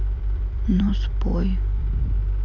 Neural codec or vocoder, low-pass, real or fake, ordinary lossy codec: vocoder, 44.1 kHz, 128 mel bands every 256 samples, BigVGAN v2; 7.2 kHz; fake; MP3, 64 kbps